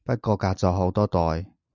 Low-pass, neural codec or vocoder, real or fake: 7.2 kHz; none; real